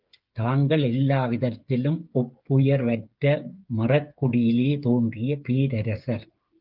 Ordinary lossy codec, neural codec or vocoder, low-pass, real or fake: Opus, 32 kbps; codec, 16 kHz, 8 kbps, FreqCodec, smaller model; 5.4 kHz; fake